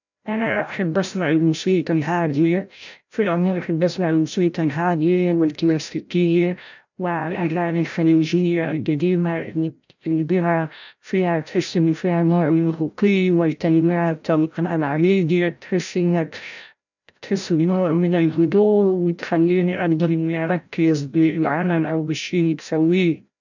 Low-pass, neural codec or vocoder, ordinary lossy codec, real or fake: 7.2 kHz; codec, 16 kHz, 0.5 kbps, FreqCodec, larger model; none; fake